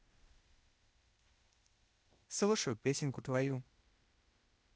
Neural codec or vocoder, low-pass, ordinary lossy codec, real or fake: codec, 16 kHz, 0.8 kbps, ZipCodec; none; none; fake